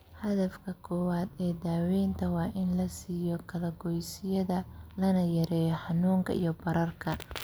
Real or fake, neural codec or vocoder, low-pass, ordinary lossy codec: real; none; none; none